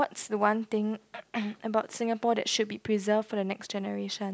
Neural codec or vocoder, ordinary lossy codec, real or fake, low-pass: none; none; real; none